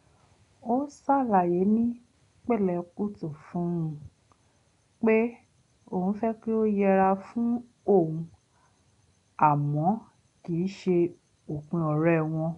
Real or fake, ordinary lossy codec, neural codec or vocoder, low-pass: real; none; none; 10.8 kHz